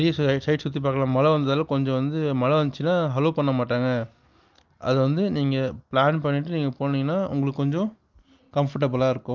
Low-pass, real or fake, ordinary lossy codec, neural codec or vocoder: 7.2 kHz; real; Opus, 32 kbps; none